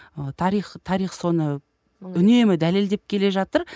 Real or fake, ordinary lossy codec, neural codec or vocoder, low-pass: real; none; none; none